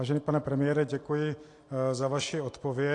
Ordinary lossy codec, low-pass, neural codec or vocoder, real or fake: AAC, 48 kbps; 10.8 kHz; none; real